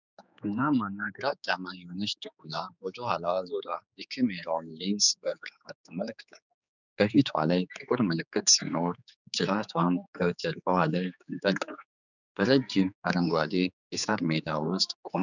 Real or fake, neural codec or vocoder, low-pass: fake; codec, 16 kHz, 4 kbps, X-Codec, HuBERT features, trained on general audio; 7.2 kHz